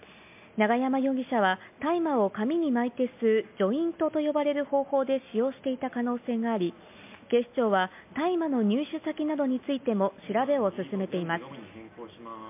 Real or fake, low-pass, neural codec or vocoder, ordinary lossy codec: real; 3.6 kHz; none; MP3, 32 kbps